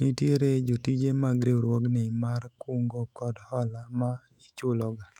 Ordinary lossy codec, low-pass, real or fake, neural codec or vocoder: none; 19.8 kHz; fake; autoencoder, 48 kHz, 128 numbers a frame, DAC-VAE, trained on Japanese speech